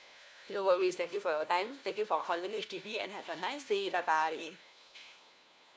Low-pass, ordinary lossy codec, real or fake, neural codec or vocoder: none; none; fake; codec, 16 kHz, 1 kbps, FunCodec, trained on LibriTTS, 50 frames a second